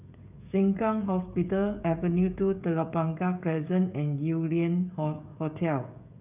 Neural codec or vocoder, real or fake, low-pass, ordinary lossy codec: codec, 16 kHz, 16 kbps, FreqCodec, smaller model; fake; 3.6 kHz; none